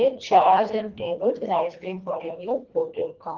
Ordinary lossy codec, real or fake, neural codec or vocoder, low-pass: Opus, 32 kbps; fake; codec, 24 kHz, 1.5 kbps, HILCodec; 7.2 kHz